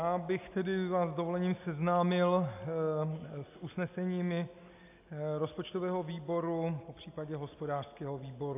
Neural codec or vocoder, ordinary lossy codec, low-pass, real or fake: none; AAC, 32 kbps; 3.6 kHz; real